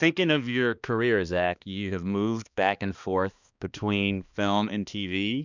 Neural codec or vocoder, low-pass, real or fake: codec, 16 kHz, 2 kbps, X-Codec, HuBERT features, trained on balanced general audio; 7.2 kHz; fake